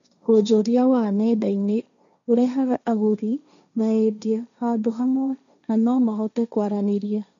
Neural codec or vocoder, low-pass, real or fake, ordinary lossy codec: codec, 16 kHz, 1.1 kbps, Voila-Tokenizer; 7.2 kHz; fake; none